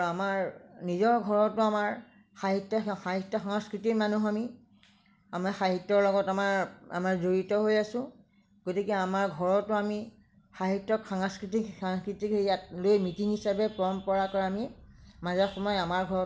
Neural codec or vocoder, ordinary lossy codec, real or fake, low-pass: none; none; real; none